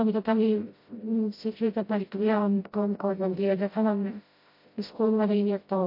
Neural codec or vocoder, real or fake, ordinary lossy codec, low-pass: codec, 16 kHz, 0.5 kbps, FreqCodec, smaller model; fake; MP3, 32 kbps; 5.4 kHz